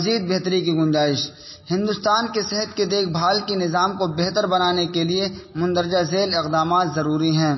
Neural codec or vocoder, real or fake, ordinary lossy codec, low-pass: none; real; MP3, 24 kbps; 7.2 kHz